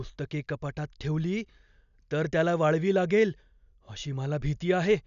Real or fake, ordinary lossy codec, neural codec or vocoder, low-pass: real; none; none; 7.2 kHz